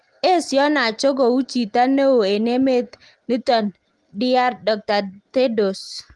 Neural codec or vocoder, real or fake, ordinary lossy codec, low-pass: none; real; Opus, 24 kbps; 10.8 kHz